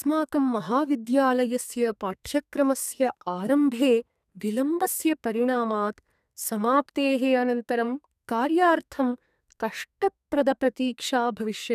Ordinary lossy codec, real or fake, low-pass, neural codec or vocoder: none; fake; 14.4 kHz; codec, 32 kHz, 1.9 kbps, SNAC